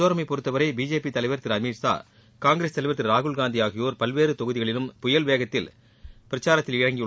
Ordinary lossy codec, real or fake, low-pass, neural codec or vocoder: none; real; none; none